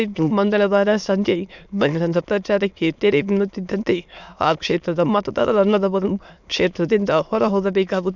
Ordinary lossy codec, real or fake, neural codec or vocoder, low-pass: none; fake; autoencoder, 22.05 kHz, a latent of 192 numbers a frame, VITS, trained on many speakers; 7.2 kHz